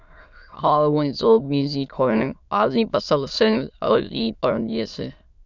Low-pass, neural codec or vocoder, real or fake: 7.2 kHz; autoencoder, 22.05 kHz, a latent of 192 numbers a frame, VITS, trained on many speakers; fake